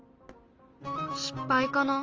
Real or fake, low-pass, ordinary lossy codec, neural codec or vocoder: real; 7.2 kHz; Opus, 24 kbps; none